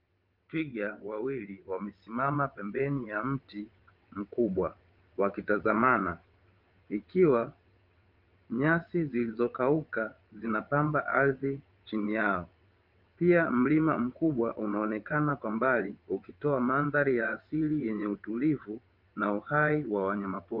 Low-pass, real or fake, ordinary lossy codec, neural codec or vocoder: 5.4 kHz; fake; Opus, 24 kbps; vocoder, 22.05 kHz, 80 mel bands, WaveNeXt